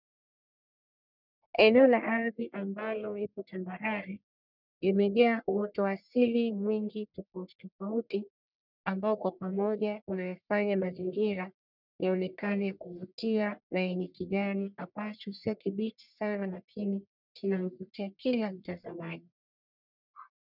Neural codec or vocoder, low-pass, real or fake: codec, 44.1 kHz, 1.7 kbps, Pupu-Codec; 5.4 kHz; fake